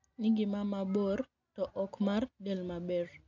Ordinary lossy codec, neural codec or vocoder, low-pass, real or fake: none; none; 7.2 kHz; real